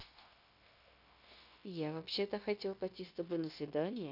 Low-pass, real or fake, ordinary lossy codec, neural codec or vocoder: 5.4 kHz; fake; none; codec, 16 kHz, 0.9 kbps, LongCat-Audio-Codec